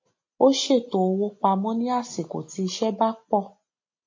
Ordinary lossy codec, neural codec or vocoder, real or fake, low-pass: MP3, 32 kbps; none; real; 7.2 kHz